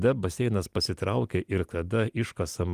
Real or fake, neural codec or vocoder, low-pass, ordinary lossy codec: real; none; 14.4 kHz; Opus, 32 kbps